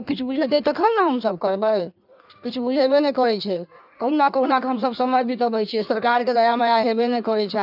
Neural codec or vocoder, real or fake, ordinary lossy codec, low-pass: codec, 16 kHz in and 24 kHz out, 1.1 kbps, FireRedTTS-2 codec; fake; none; 5.4 kHz